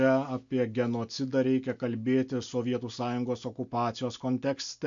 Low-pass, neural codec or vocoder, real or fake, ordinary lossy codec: 7.2 kHz; none; real; MP3, 64 kbps